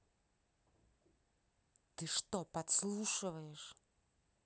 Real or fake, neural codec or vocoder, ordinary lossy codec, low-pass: real; none; none; none